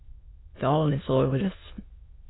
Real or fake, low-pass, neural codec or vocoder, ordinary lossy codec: fake; 7.2 kHz; autoencoder, 22.05 kHz, a latent of 192 numbers a frame, VITS, trained on many speakers; AAC, 16 kbps